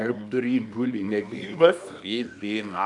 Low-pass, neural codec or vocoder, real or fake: 10.8 kHz; codec, 24 kHz, 0.9 kbps, WavTokenizer, small release; fake